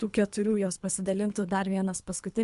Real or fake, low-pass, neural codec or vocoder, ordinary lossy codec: fake; 10.8 kHz; codec, 24 kHz, 3 kbps, HILCodec; MP3, 64 kbps